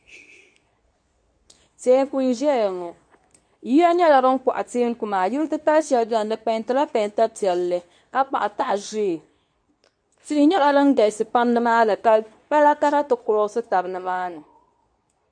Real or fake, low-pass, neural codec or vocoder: fake; 9.9 kHz; codec, 24 kHz, 0.9 kbps, WavTokenizer, medium speech release version 2